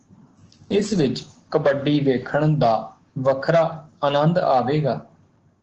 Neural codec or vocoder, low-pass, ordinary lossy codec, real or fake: codec, 16 kHz, 6 kbps, DAC; 7.2 kHz; Opus, 16 kbps; fake